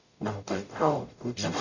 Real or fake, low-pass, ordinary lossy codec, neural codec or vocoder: fake; 7.2 kHz; none; codec, 44.1 kHz, 0.9 kbps, DAC